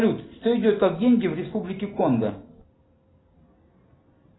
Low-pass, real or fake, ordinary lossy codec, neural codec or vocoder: 7.2 kHz; real; AAC, 16 kbps; none